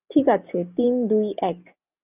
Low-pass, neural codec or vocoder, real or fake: 3.6 kHz; none; real